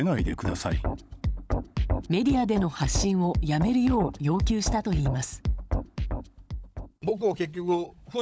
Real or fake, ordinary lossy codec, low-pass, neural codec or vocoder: fake; none; none; codec, 16 kHz, 16 kbps, FunCodec, trained on LibriTTS, 50 frames a second